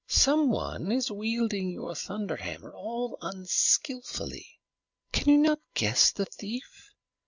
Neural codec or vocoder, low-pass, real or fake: none; 7.2 kHz; real